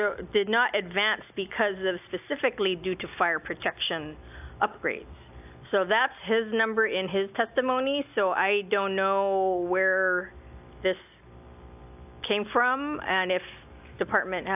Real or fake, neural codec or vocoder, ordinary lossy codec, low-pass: real; none; AAC, 32 kbps; 3.6 kHz